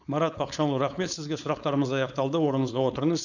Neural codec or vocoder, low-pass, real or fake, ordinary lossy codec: codec, 16 kHz, 4.8 kbps, FACodec; 7.2 kHz; fake; none